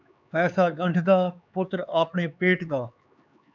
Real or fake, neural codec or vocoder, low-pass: fake; codec, 16 kHz, 4 kbps, X-Codec, HuBERT features, trained on LibriSpeech; 7.2 kHz